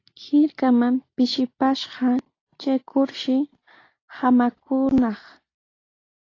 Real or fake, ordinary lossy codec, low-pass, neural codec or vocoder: real; AAC, 32 kbps; 7.2 kHz; none